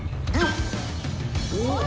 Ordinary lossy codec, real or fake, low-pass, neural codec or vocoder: none; real; none; none